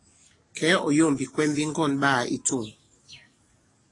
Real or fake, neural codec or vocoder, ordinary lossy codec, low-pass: fake; codec, 44.1 kHz, 7.8 kbps, DAC; AAC, 32 kbps; 10.8 kHz